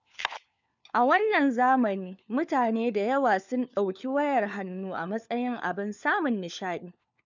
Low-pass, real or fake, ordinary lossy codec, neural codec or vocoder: 7.2 kHz; fake; none; codec, 16 kHz, 4 kbps, FunCodec, trained on LibriTTS, 50 frames a second